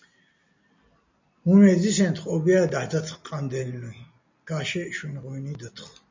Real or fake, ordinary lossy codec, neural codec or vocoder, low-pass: real; MP3, 64 kbps; none; 7.2 kHz